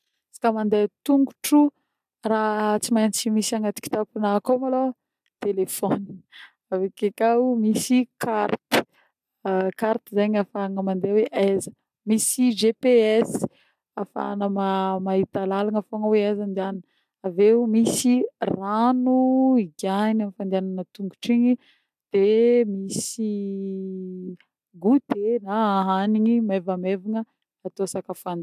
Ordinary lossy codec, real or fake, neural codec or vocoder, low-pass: AAC, 96 kbps; real; none; 14.4 kHz